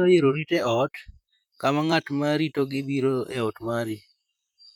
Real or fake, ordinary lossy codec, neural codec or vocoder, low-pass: fake; none; vocoder, 44.1 kHz, 128 mel bands, Pupu-Vocoder; 19.8 kHz